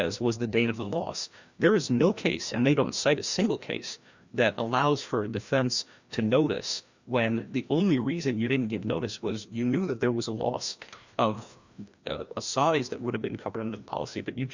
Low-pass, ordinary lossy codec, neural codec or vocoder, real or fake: 7.2 kHz; Opus, 64 kbps; codec, 16 kHz, 1 kbps, FreqCodec, larger model; fake